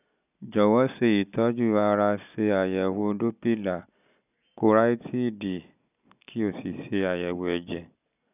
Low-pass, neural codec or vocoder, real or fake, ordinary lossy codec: 3.6 kHz; none; real; none